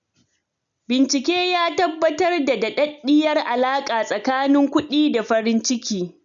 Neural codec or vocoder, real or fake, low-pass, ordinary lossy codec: none; real; 7.2 kHz; none